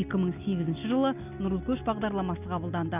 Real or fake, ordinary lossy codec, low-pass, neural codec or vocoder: real; none; 3.6 kHz; none